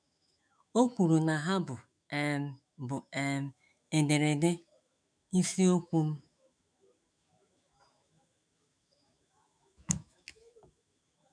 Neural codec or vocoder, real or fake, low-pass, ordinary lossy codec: autoencoder, 48 kHz, 128 numbers a frame, DAC-VAE, trained on Japanese speech; fake; 9.9 kHz; none